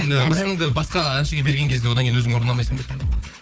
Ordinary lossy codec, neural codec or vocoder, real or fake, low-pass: none; codec, 16 kHz, 4 kbps, FunCodec, trained on Chinese and English, 50 frames a second; fake; none